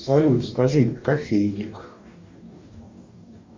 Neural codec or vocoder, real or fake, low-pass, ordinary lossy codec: codec, 44.1 kHz, 2.6 kbps, DAC; fake; 7.2 kHz; MP3, 64 kbps